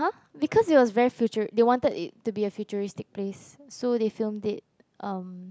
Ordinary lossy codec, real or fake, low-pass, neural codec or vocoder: none; real; none; none